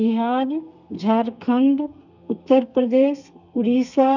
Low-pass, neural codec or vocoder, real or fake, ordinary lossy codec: 7.2 kHz; codec, 32 kHz, 1.9 kbps, SNAC; fake; none